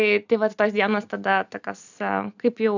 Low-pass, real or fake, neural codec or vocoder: 7.2 kHz; real; none